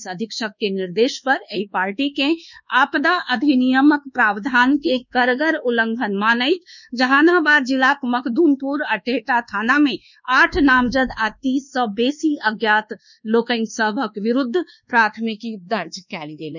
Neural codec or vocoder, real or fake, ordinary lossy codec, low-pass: codec, 24 kHz, 1.2 kbps, DualCodec; fake; none; 7.2 kHz